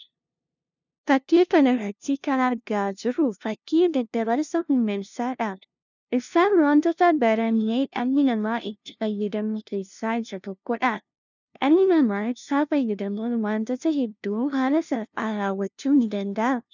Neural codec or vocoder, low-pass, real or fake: codec, 16 kHz, 0.5 kbps, FunCodec, trained on LibriTTS, 25 frames a second; 7.2 kHz; fake